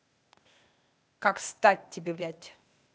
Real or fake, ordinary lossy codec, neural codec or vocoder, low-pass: fake; none; codec, 16 kHz, 0.8 kbps, ZipCodec; none